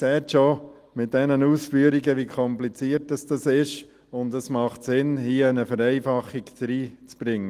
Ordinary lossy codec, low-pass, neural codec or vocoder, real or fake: Opus, 24 kbps; 14.4 kHz; none; real